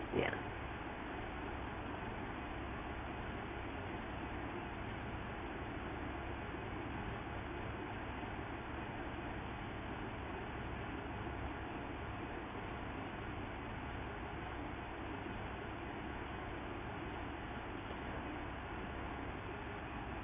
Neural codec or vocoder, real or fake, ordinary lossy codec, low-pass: codec, 16 kHz in and 24 kHz out, 1 kbps, XY-Tokenizer; fake; AAC, 16 kbps; 3.6 kHz